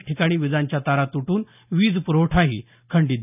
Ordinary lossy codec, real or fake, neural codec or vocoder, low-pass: none; real; none; 3.6 kHz